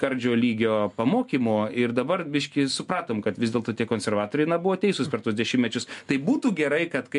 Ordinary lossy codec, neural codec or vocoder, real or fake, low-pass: MP3, 64 kbps; none; real; 10.8 kHz